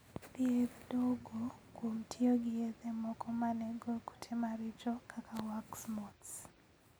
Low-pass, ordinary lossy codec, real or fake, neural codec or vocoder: none; none; real; none